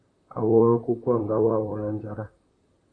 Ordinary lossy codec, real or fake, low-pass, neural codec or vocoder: AAC, 32 kbps; fake; 9.9 kHz; vocoder, 44.1 kHz, 128 mel bands, Pupu-Vocoder